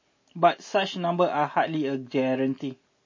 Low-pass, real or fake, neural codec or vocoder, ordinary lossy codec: 7.2 kHz; real; none; MP3, 32 kbps